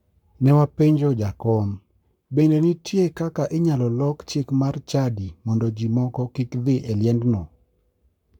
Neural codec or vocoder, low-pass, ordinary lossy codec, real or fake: codec, 44.1 kHz, 7.8 kbps, Pupu-Codec; 19.8 kHz; none; fake